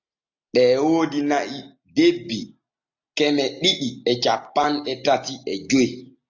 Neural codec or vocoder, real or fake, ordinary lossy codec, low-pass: none; real; AAC, 48 kbps; 7.2 kHz